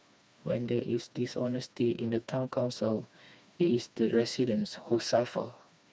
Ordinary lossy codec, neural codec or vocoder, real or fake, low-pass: none; codec, 16 kHz, 2 kbps, FreqCodec, smaller model; fake; none